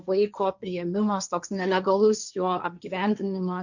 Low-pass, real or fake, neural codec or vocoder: 7.2 kHz; fake; codec, 16 kHz, 1.1 kbps, Voila-Tokenizer